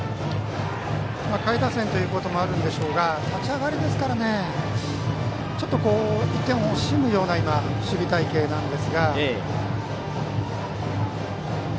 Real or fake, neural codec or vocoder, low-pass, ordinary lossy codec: real; none; none; none